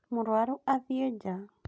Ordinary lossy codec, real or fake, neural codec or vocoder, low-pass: none; real; none; none